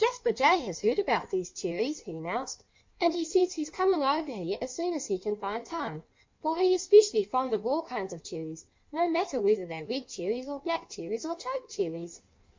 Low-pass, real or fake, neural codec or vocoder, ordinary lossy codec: 7.2 kHz; fake; codec, 16 kHz in and 24 kHz out, 1.1 kbps, FireRedTTS-2 codec; MP3, 48 kbps